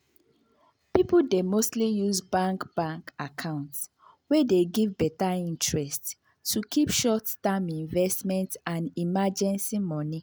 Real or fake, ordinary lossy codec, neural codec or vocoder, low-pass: real; none; none; none